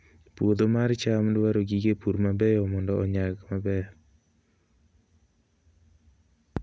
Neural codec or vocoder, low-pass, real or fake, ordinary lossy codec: none; none; real; none